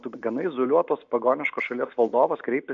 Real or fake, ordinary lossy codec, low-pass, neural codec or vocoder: real; MP3, 64 kbps; 7.2 kHz; none